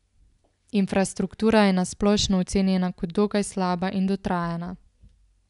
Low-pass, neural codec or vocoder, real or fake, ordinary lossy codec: 10.8 kHz; none; real; none